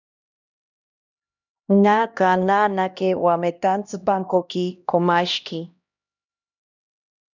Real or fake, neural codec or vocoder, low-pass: fake; codec, 16 kHz, 1 kbps, X-Codec, HuBERT features, trained on LibriSpeech; 7.2 kHz